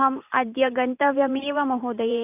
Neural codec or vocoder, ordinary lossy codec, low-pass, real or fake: vocoder, 44.1 kHz, 128 mel bands every 512 samples, BigVGAN v2; none; 3.6 kHz; fake